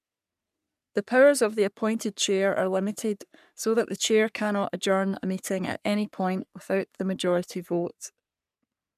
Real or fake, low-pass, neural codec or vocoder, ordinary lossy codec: fake; 14.4 kHz; codec, 44.1 kHz, 3.4 kbps, Pupu-Codec; none